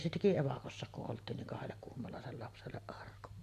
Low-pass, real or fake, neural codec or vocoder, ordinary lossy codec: 14.4 kHz; real; none; AAC, 64 kbps